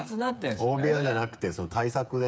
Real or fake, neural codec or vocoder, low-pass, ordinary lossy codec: fake; codec, 16 kHz, 8 kbps, FreqCodec, smaller model; none; none